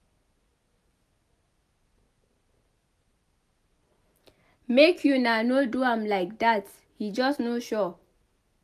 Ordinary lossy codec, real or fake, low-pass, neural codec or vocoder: none; real; 14.4 kHz; none